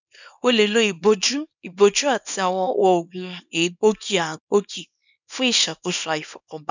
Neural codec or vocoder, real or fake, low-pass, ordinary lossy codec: codec, 24 kHz, 0.9 kbps, WavTokenizer, small release; fake; 7.2 kHz; none